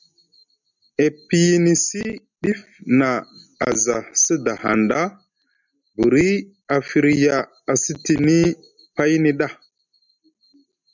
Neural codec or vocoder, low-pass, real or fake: none; 7.2 kHz; real